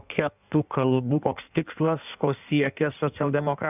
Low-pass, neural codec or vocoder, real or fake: 3.6 kHz; codec, 16 kHz in and 24 kHz out, 1.1 kbps, FireRedTTS-2 codec; fake